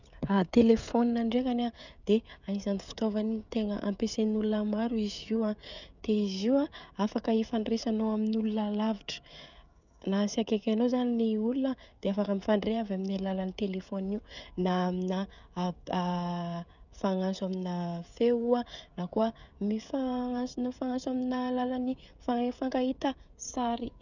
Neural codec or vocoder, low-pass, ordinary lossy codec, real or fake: codec, 16 kHz, 16 kbps, FreqCodec, smaller model; 7.2 kHz; none; fake